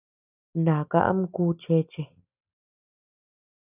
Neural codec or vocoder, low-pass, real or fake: none; 3.6 kHz; real